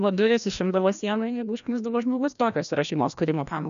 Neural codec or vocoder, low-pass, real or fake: codec, 16 kHz, 1 kbps, FreqCodec, larger model; 7.2 kHz; fake